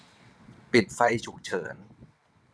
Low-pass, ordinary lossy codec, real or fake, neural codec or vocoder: none; none; fake; vocoder, 22.05 kHz, 80 mel bands, WaveNeXt